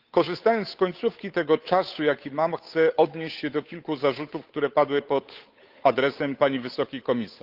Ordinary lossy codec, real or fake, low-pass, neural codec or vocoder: Opus, 32 kbps; fake; 5.4 kHz; codec, 16 kHz, 8 kbps, FunCodec, trained on Chinese and English, 25 frames a second